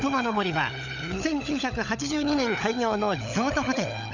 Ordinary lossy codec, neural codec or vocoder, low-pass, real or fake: none; codec, 16 kHz, 16 kbps, FunCodec, trained on LibriTTS, 50 frames a second; 7.2 kHz; fake